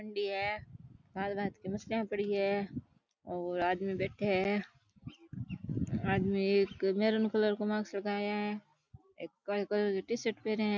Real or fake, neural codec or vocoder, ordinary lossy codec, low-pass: real; none; none; 7.2 kHz